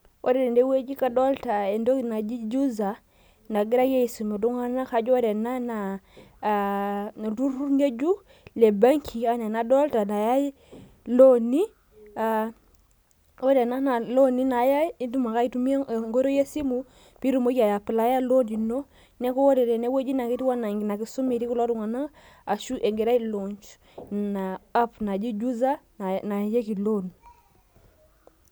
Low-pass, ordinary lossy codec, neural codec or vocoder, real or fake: none; none; none; real